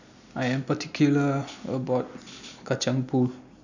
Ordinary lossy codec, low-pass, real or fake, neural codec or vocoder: none; 7.2 kHz; real; none